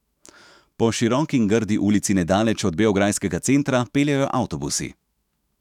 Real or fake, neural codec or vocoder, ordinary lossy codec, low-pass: fake; autoencoder, 48 kHz, 128 numbers a frame, DAC-VAE, trained on Japanese speech; none; 19.8 kHz